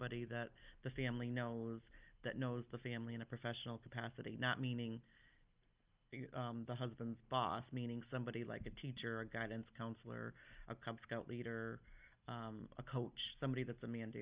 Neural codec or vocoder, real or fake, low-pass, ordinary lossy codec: none; real; 3.6 kHz; Opus, 24 kbps